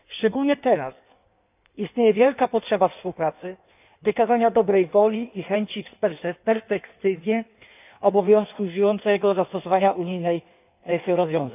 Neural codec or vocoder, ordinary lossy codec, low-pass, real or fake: codec, 16 kHz in and 24 kHz out, 1.1 kbps, FireRedTTS-2 codec; none; 3.6 kHz; fake